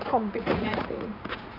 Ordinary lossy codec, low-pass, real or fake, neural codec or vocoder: none; 5.4 kHz; fake; vocoder, 44.1 kHz, 80 mel bands, Vocos